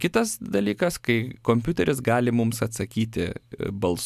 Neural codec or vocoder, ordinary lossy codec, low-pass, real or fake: none; MP3, 96 kbps; 14.4 kHz; real